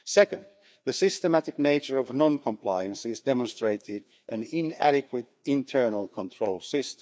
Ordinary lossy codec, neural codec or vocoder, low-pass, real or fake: none; codec, 16 kHz, 2 kbps, FreqCodec, larger model; none; fake